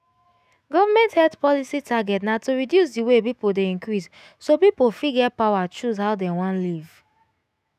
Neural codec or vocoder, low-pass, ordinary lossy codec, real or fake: autoencoder, 48 kHz, 128 numbers a frame, DAC-VAE, trained on Japanese speech; 14.4 kHz; none; fake